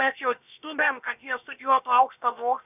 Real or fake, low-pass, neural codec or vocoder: fake; 3.6 kHz; codec, 16 kHz, about 1 kbps, DyCAST, with the encoder's durations